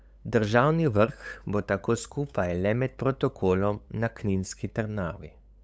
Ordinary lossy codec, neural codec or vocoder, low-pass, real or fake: none; codec, 16 kHz, 8 kbps, FunCodec, trained on LibriTTS, 25 frames a second; none; fake